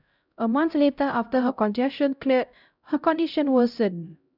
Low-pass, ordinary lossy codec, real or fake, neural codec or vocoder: 5.4 kHz; none; fake; codec, 16 kHz, 0.5 kbps, X-Codec, HuBERT features, trained on LibriSpeech